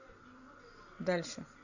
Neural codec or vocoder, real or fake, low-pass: none; real; 7.2 kHz